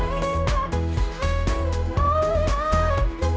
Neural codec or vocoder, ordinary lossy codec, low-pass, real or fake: codec, 16 kHz, 1 kbps, X-Codec, HuBERT features, trained on general audio; none; none; fake